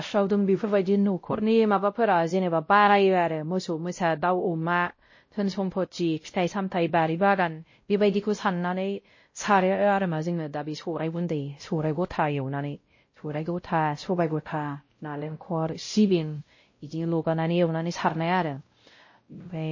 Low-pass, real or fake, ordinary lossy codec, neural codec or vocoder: 7.2 kHz; fake; MP3, 32 kbps; codec, 16 kHz, 0.5 kbps, X-Codec, WavLM features, trained on Multilingual LibriSpeech